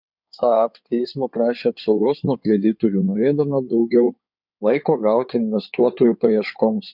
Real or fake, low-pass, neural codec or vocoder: fake; 5.4 kHz; codec, 16 kHz in and 24 kHz out, 2.2 kbps, FireRedTTS-2 codec